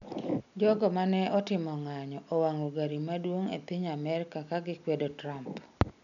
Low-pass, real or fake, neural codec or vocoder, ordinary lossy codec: 7.2 kHz; real; none; none